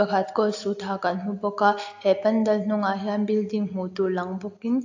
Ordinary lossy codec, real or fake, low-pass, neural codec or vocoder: AAC, 48 kbps; real; 7.2 kHz; none